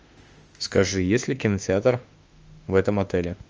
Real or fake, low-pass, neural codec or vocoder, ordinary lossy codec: fake; 7.2 kHz; autoencoder, 48 kHz, 32 numbers a frame, DAC-VAE, trained on Japanese speech; Opus, 24 kbps